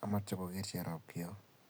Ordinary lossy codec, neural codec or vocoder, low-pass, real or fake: none; none; none; real